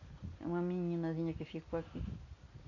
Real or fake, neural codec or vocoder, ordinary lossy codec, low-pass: real; none; none; 7.2 kHz